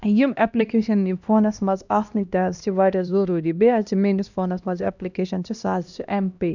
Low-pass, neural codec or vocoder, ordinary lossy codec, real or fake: 7.2 kHz; codec, 16 kHz, 1 kbps, X-Codec, HuBERT features, trained on LibriSpeech; none; fake